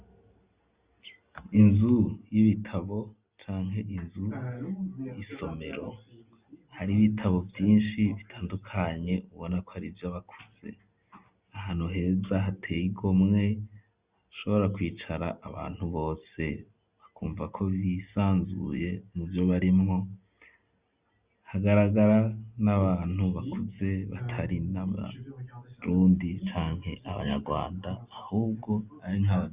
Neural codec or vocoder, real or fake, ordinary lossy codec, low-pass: none; real; Opus, 64 kbps; 3.6 kHz